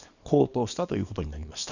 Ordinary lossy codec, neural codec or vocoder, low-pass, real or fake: none; codec, 24 kHz, 0.9 kbps, WavTokenizer, small release; 7.2 kHz; fake